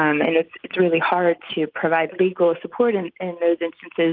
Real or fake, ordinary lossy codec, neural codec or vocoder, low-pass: real; Opus, 32 kbps; none; 5.4 kHz